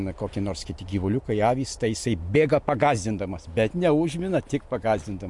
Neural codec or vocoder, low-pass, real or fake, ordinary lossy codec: none; 10.8 kHz; real; MP3, 64 kbps